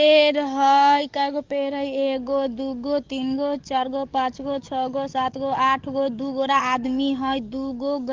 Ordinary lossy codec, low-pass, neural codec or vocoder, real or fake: Opus, 32 kbps; 7.2 kHz; codec, 44.1 kHz, 7.8 kbps, DAC; fake